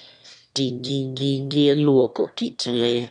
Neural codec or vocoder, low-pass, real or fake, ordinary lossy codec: autoencoder, 22.05 kHz, a latent of 192 numbers a frame, VITS, trained on one speaker; 9.9 kHz; fake; none